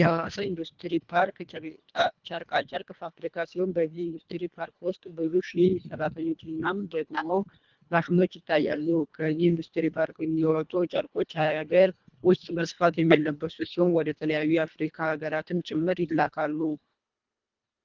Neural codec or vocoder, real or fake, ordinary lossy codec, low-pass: codec, 24 kHz, 1.5 kbps, HILCodec; fake; Opus, 24 kbps; 7.2 kHz